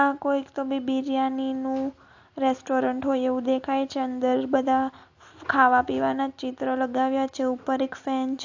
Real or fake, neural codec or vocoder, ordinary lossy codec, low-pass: real; none; AAC, 48 kbps; 7.2 kHz